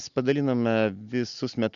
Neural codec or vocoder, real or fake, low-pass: none; real; 7.2 kHz